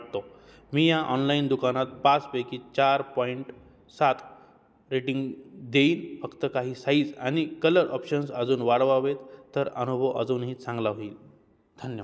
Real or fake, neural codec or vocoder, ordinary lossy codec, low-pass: real; none; none; none